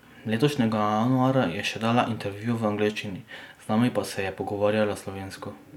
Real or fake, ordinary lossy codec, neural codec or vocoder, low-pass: real; none; none; 19.8 kHz